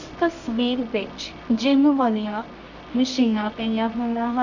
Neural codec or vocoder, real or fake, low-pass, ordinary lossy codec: codec, 24 kHz, 0.9 kbps, WavTokenizer, medium music audio release; fake; 7.2 kHz; none